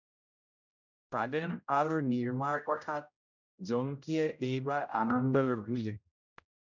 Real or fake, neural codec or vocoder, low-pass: fake; codec, 16 kHz, 0.5 kbps, X-Codec, HuBERT features, trained on general audio; 7.2 kHz